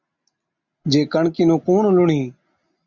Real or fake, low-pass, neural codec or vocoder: real; 7.2 kHz; none